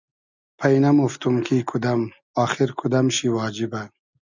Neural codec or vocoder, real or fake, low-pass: none; real; 7.2 kHz